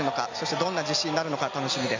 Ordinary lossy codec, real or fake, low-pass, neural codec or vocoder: MP3, 64 kbps; real; 7.2 kHz; none